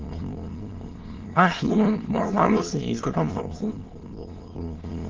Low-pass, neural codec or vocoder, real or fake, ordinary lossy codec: 7.2 kHz; autoencoder, 22.05 kHz, a latent of 192 numbers a frame, VITS, trained on many speakers; fake; Opus, 16 kbps